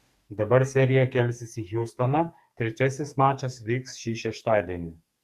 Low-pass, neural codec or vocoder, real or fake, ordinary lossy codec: 14.4 kHz; codec, 44.1 kHz, 2.6 kbps, SNAC; fake; Opus, 64 kbps